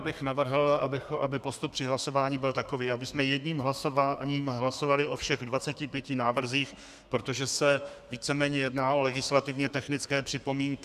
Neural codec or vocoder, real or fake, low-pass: codec, 32 kHz, 1.9 kbps, SNAC; fake; 14.4 kHz